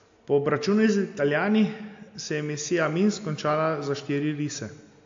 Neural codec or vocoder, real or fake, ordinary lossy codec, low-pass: none; real; AAC, 48 kbps; 7.2 kHz